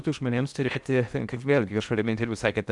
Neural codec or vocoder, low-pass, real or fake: codec, 16 kHz in and 24 kHz out, 0.8 kbps, FocalCodec, streaming, 65536 codes; 10.8 kHz; fake